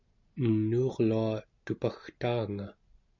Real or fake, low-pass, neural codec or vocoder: real; 7.2 kHz; none